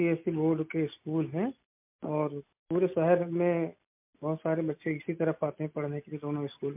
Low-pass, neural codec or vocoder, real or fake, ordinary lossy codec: 3.6 kHz; none; real; MP3, 32 kbps